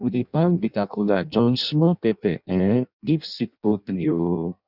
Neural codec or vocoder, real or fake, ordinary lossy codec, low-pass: codec, 16 kHz in and 24 kHz out, 0.6 kbps, FireRedTTS-2 codec; fake; none; 5.4 kHz